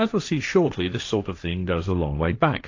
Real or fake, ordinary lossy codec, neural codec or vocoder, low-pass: fake; AAC, 48 kbps; codec, 16 kHz, 1.1 kbps, Voila-Tokenizer; 7.2 kHz